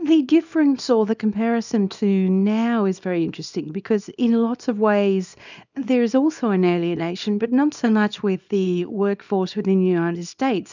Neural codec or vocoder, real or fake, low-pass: codec, 24 kHz, 0.9 kbps, WavTokenizer, small release; fake; 7.2 kHz